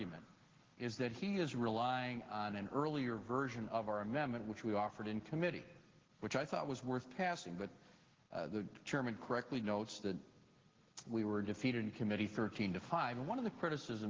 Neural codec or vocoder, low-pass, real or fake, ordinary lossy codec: none; 7.2 kHz; real; Opus, 16 kbps